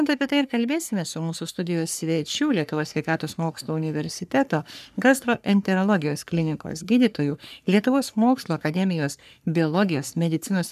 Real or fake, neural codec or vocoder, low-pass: fake; codec, 44.1 kHz, 3.4 kbps, Pupu-Codec; 14.4 kHz